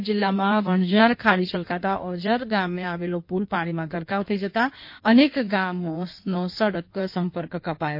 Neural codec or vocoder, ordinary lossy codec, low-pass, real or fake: codec, 16 kHz in and 24 kHz out, 1.1 kbps, FireRedTTS-2 codec; MP3, 32 kbps; 5.4 kHz; fake